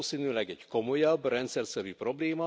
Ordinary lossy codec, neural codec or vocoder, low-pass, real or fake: none; none; none; real